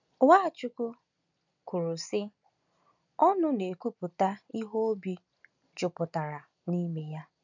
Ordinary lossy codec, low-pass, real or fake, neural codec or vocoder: none; 7.2 kHz; real; none